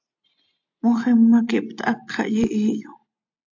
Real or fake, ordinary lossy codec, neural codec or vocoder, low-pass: real; AAC, 48 kbps; none; 7.2 kHz